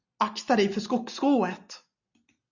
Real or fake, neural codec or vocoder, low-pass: real; none; 7.2 kHz